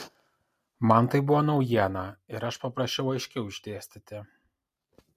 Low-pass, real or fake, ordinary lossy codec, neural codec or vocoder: 14.4 kHz; real; MP3, 64 kbps; none